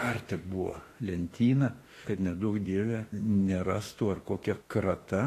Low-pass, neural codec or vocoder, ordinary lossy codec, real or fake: 14.4 kHz; autoencoder, 48 kHz, 32 numbers a frame, DAC-VAE, trained on Japanese speech; AAC, 48 kbps; fake